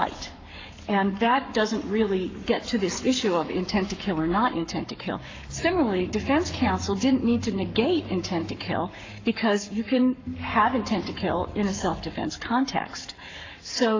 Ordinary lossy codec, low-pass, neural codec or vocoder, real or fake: AAC, 48 kbps; 7.2 kHz; codec, 44.1 kHz, 7.8 kbps, Pupu-Codec; fake